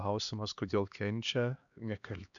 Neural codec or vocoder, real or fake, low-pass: codec, 16 kHz, 2 kbps, X-Codec, HuBERT features, trained on LibriSpeech; fake; 7.2 kHz